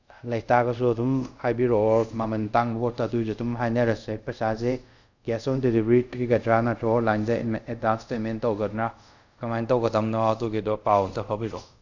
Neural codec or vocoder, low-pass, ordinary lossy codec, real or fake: codec, 24 kHz, 0.5 kbps, DualCodec; 7.2 kHz; none; fake